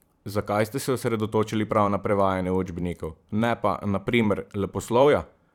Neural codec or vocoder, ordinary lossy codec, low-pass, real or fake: vocoder, 44.1 kHz, 128 mel bands every 512 samples, BigVGAN v2; none; 19.8 kHz; fake